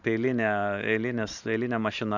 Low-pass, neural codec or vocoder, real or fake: 7.2 kHz; vocoder, 44.1 kHz, 128 mel bands every 512 samples, BigVGAN v2; fake